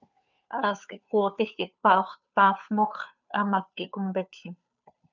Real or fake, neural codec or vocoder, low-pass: fake; codec, 16 kHz, 2 kbps, FunCodec, trained on Chinese and English, 25 frames a second; 7.2 kHz